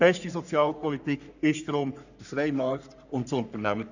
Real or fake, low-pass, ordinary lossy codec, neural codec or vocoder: fake; 7.2 kHz; none; codec, 44.1 kHz, 3.4 kbps, Pupu-Codec